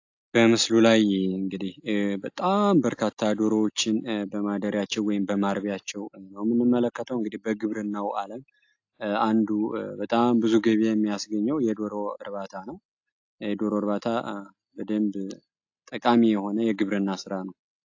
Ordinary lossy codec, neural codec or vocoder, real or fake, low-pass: AAC, 48 kbps; none; real; 7.2 kHz